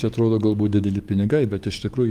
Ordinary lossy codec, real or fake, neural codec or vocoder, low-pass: Opus, 32 kbps; fake; codec, 44.1 kHz, 7.8 kbps, DAC; 14.4 kHz